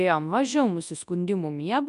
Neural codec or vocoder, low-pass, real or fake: codec, 24 kHz, 0.9 kbps, WavTokenizer, large speech release; 10.8 kHz; fake